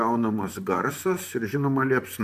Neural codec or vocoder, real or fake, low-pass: vocoder, 44.1 kHz, 128 mel bands, Pupu-Vocoder; fake; 14.4 kHz